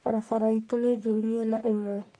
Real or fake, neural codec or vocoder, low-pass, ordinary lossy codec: fake; codec, 44.1 kHz, 1.7 kbps, Pupu-Codec; 9.9 kHz; MP3, 48 kbps